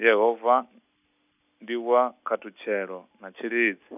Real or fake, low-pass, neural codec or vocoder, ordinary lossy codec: real; 3.6 kHz; none; none